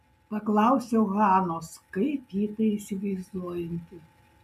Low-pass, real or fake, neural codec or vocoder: 14.4 kHz; real; none